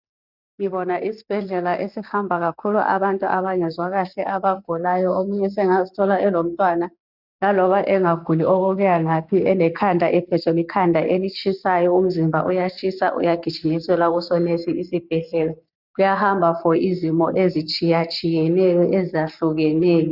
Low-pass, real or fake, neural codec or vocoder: 5.4 kHz; real; none